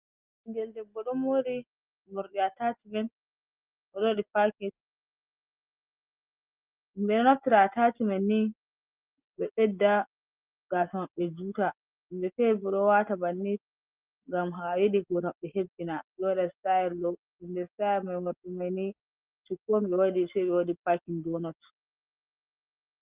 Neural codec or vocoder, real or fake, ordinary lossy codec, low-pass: none; real; Opus, 32 kbps; 3.6 kHz